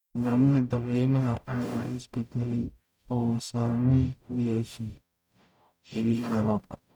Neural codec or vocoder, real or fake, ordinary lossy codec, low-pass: codec, 44.1 kHz, 0.9 kbps, DAC; fake; none; 19.8 kHz